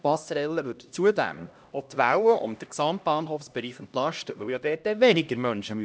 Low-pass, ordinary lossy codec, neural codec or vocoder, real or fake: none; none; codec, 16 kHz, 1 kbps, X-Codec, HuBERT features, trained on LibriSpeech; fake